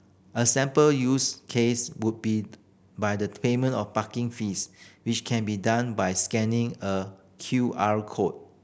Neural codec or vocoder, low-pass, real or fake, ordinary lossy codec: none; none; real; none